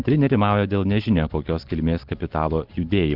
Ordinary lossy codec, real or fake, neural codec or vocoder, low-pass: Opus, 16 kbps; real; none; 5.4 kHz